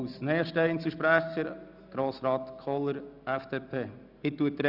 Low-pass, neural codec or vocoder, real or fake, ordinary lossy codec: 5.4 kHz; none; real; none